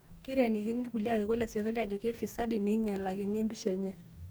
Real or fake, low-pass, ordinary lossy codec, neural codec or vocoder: fake; none; none; codec, 44.1 kHz, 2.6 kbps, DAC